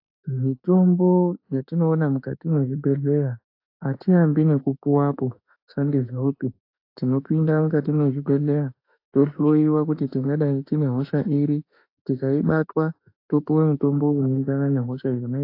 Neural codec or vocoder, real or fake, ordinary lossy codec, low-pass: autoencoder, 48 kHz, 32 numbers a frame, DAC-VAE, trained on Japanese speech; fake; AAC, 32 kbps; 5.4 kHz